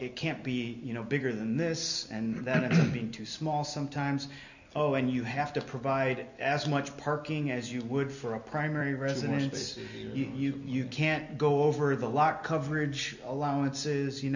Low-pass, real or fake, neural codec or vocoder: 7.2 kHz; real; none